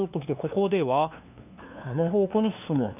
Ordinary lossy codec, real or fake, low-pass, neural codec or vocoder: none; fake; 3.6 kHz; codec, 16 kHz, 2 kbps, FunCodec, trained on LibriTTS, 25 frames a second